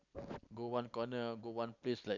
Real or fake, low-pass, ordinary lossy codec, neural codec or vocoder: real; 7.2 kHz; none; none